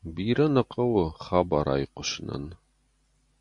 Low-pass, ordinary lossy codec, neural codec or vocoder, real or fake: 10.8 kHz; MP3, 64 kbps; none; real